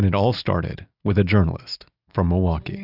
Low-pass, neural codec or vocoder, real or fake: 5.4 kHz; none; real